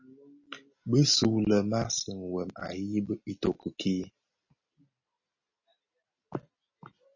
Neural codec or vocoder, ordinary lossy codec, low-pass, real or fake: none; MP3, 32 kbps; 7.2 kHz; real